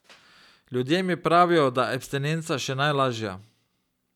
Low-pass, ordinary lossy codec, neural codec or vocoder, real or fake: 19.8 kHz; none; none; real